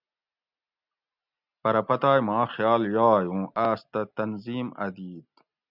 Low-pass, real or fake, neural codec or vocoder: 5.4 kHz; real; none